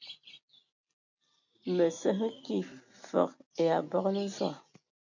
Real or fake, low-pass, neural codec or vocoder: real; 7.2 kHz; none